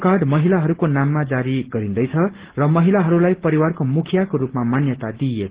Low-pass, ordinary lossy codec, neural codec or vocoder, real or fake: 3.6 kHz; Opus, 16 kbps; none; real